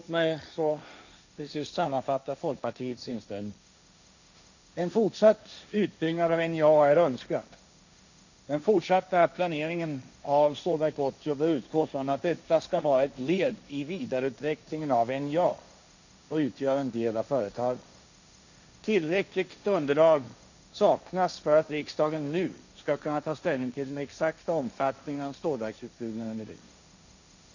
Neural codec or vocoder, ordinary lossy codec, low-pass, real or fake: codec, 16 kHz, 1.1 kbps, Voila-Tokenizer; none; 7.2 kHz; fake